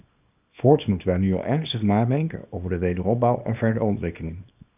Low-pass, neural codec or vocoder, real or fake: 3.6 kHz; codec, 24 kHz, 0.9 kbps, WavTokenizer, small release; fake